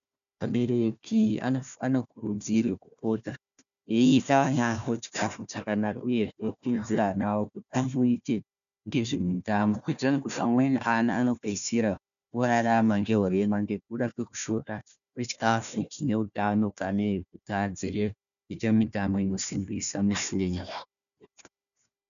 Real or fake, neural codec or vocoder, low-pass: fake; codec, 16 kHz, 1 kbps, FunCodec, trained on Chinese and English, 50 frames a second; 7.2 kHz